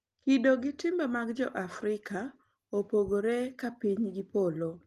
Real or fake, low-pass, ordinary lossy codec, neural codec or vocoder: real; 14.4 kHz; Opus, 24 kbps; none